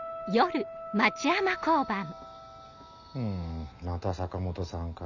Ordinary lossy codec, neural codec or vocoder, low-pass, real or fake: none; none; 7.2 kHz; real